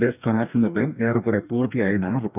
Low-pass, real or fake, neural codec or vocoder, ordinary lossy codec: 3.6 kHz; fake; codec, 44.1 kHz, 2.6 kbps, DAC; none